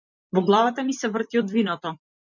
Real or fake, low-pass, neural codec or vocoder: fake; 7.2 kHz; vocoder, 44.1 kHz, 128 mel bands every 256 samples, BigVGAN v2